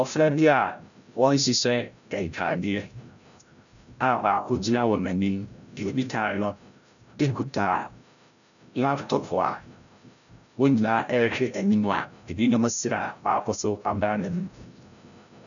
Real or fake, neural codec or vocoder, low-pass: fake; codec, 16 kHz, 0.5 kbps, FreqCodec, larger model; 7.2 kHz